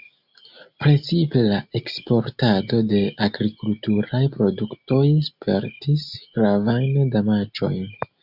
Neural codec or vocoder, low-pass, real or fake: none; 5.4 kHz; real